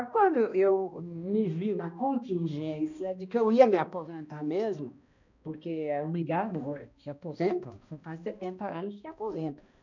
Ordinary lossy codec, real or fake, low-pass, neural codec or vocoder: none; fake; 7.2 kHz; codec, 16 kHz, 1 kbps, X-Codec, HuBERT features, trained on balanced general audio